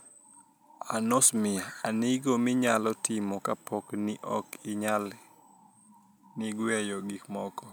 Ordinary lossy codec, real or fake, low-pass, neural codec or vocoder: none; real; none; none